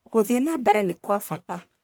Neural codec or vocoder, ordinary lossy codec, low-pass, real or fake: codec, 44.1 kHz, 1.7 kbps, Pupu-Codec; none; none; fake